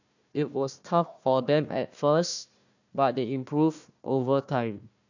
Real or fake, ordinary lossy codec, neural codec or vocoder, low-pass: fake; none; codec, 16 kHz, 1 kbps, FunCodec, trained on Chinese and English, 50 frames a second; 7.2 kHz